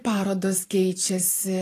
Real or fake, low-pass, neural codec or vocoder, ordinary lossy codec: fake; 14.4 kHz; vocoder, 44.1 kHz, 128 mel bands every 512 samples, BigVGAN v2; AAC, 48 kbps